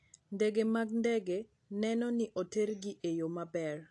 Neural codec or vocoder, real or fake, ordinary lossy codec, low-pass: none; real; AAC, 48 kbps; 10.8 kHz